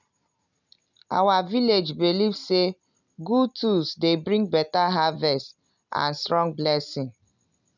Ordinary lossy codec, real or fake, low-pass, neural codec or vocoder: none; real; 7.2 kHz; none